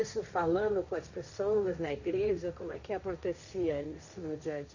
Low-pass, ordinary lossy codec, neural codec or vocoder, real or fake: 7.2 kHz; none; codec, 16 kHz, 1.1 kbps, Voila-Tokenizer; fake